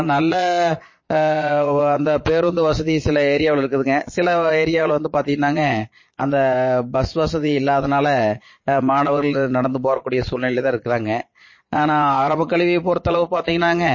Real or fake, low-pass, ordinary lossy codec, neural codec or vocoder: fake; 7.2 kHz; MP3, 32 kbps; vocoder, 22.05 kHz, 80 mel bands, Vocos